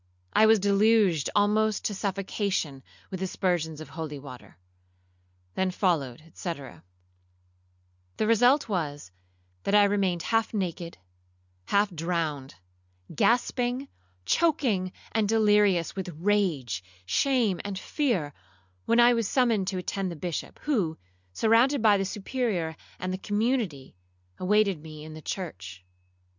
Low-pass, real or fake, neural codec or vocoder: 7.2 kHz; real; none